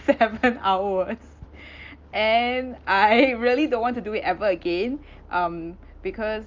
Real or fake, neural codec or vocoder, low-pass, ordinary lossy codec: real; none; 7.2 kHz; Opus, 32 kbps